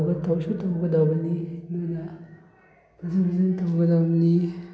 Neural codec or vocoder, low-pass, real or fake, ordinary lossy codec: none; none; real; none